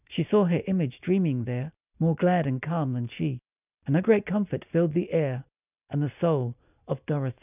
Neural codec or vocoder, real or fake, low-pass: none; real; 3.6 kHz